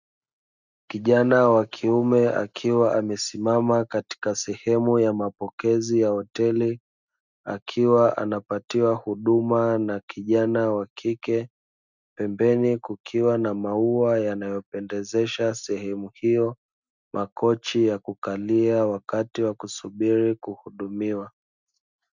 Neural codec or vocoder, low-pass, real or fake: none; 7.2 kHz; real